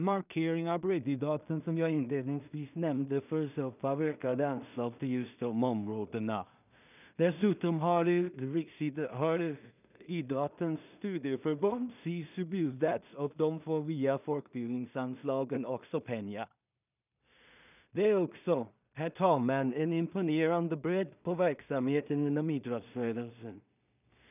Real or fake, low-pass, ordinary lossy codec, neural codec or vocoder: fake; 3.6 kHz; none; codec, 16 kHz in and 24 kHz out, 0.4 kbps, LongCat-Audio-Codec, two codebook decoder